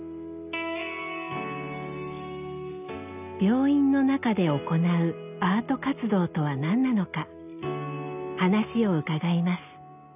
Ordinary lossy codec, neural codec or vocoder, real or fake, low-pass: none; none; real; 3.6 kHz